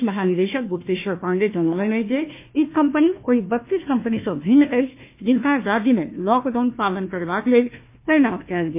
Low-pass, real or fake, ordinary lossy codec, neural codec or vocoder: 3.6 kHz; fake; MP3, 24 kbps; codec, 16 kHz, 1 kbps, FunCodec, trained on Chinese and English, 50 frames a second